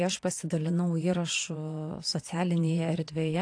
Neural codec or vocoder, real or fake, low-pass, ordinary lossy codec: vocoder, 22.05 kHz, 80 mel bands, Vocos; fake; 9.9 kHz; AAC, 48 kbps